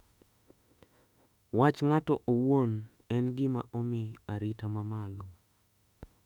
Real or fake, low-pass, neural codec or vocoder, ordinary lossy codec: fake; 19.8 kHz; autoencoder, 48 kHz, 32 numbers a frame, DAC-VAE, trained on Japanese speech; none